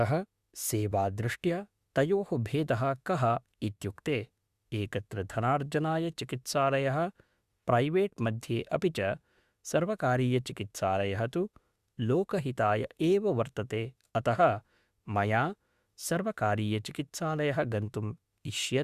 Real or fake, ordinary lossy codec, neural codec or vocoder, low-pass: fake; Opus, 64 kbps; autoencoder, 48 kHz, 32 numbers a frame, DAC-VAE, trained on Japanese speech; 14.4 kHz